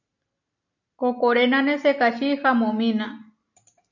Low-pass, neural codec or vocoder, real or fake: 7.2 kHz; none; real